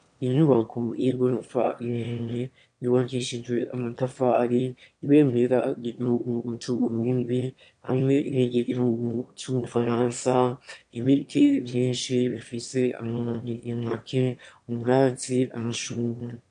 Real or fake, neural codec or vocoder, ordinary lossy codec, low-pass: fake; autoencoder, 22.05 kHz, a latent of 192 numbers a frame, VITS, trained on one speaker; MP3, 64 kbps; 9.9 kHz